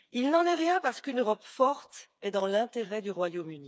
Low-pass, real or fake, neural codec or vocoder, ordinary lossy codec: none; fake; codec, 16 kHz, 4 kbps, FreqCodec, smaller model; none